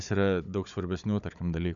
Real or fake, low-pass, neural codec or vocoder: fake; 7.2 kHz; codec, 16 kHz, 16 kbps, FunCodec, trained on Chinese and English, 50 frames a second